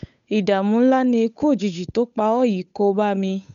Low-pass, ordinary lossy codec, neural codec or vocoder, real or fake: 7.2 kHz; none; codec, 16 kHz, 8 kbps, FunCodec, trained on Chinese and English, 25 frames a second; fake